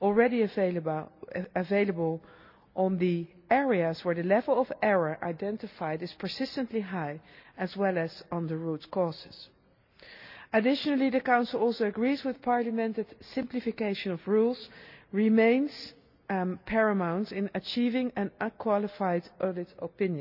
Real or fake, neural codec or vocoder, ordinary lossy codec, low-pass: real; none; none; 5.4 kHz